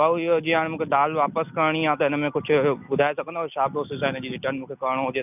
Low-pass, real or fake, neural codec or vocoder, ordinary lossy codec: 3.6 kHz; real; none; none